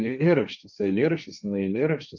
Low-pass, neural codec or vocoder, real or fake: 7.2 kHz; codec, 16 kHz, 1.1 kbps, Voila-Tokenizer; fake